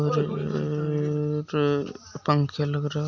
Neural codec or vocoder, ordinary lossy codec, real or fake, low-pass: none; none; real; 7.2 kHz